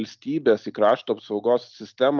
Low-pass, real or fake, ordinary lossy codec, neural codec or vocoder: 7.2 kHz; real; Opus, 24 kbps; none